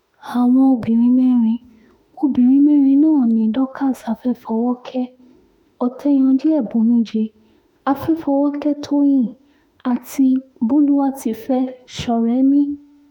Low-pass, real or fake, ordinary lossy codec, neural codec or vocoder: 19.8 kHz; fake; none; autoencoder, 48 kHz, 32 numbers a frame, DAC-VAE, trained on Japanese speech